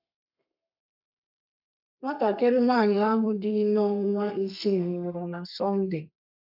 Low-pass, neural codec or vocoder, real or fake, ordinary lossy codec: 5.4 kHz; codec, 32 kHz, 1.9 kbps, SNAC; fake; none